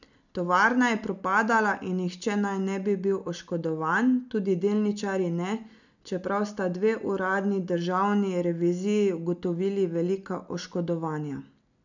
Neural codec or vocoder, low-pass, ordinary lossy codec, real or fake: none; 7.2 kHz; none; real